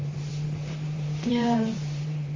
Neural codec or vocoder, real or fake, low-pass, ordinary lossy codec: vocoder, 44.1 kHz, 128 mel bands, Pupu-Vocoder; fake; 7.2 kHz; Opus, 32 kbps